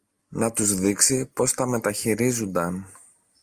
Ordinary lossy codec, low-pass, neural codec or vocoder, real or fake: Opus, 32 kbps; 14.4 kHz; none; real